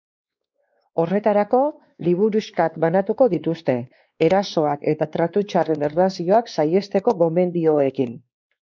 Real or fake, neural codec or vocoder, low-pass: fake; codec, 16 kHz, 2 kbps, X-Codec, WavLM features, trained on Multilingual LibriSpeech; 7.2 kHz